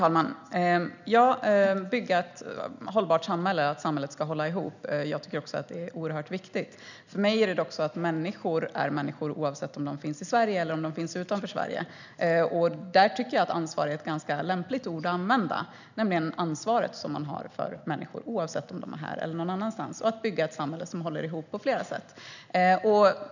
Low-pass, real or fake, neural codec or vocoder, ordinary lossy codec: 7.2 kHz; real; none; none